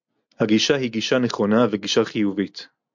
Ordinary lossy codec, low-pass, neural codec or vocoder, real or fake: MP3, 64 kbps; 7.2 kHz; none; real